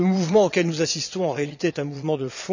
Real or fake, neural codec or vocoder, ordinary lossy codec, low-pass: fake; vocoder, 22.05 kHz, 80 mel bands, Vocos; none; 7.2 kHz